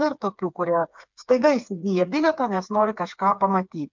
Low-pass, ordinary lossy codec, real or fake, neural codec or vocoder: 7.2 kHz; MP3, 64 kbps; fake; codec, 16 kHz, 4 kbps, FreqCodec, smaller model